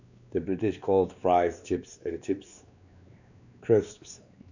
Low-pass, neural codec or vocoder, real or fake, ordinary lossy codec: 7.2 kHz; codec, 16 kHz, 2 kbps, X-Codec, WavLM features, trained on Multilingual LibriSpeech; fake; none